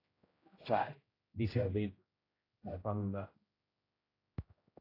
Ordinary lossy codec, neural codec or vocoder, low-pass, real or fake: AAC, 24 kbps; codec, 16 kHz, 0.5 kbps, X-Codec, HuBERT features, trained on general audio; 5.4 kHz; fake